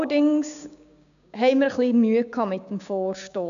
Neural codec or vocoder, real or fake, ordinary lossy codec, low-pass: codec, 16 kHz, 6 kbps, DAC; fake; none; 7.2 kHz